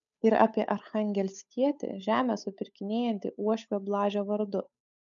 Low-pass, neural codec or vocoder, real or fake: 7.2 kHz; codec, 16 kHz, 8 kbps, FunCodec, trained on Chinese and English, 25 frames a second; fake